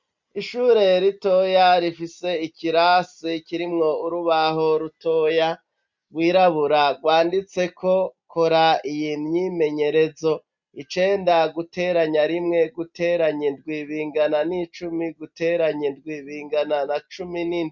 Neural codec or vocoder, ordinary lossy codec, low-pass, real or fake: none; MP3, 64 kbps; 7.2 kHz; real